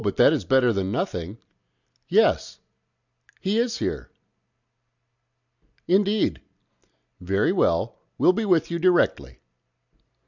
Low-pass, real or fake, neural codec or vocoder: 7.2 kHz; real; none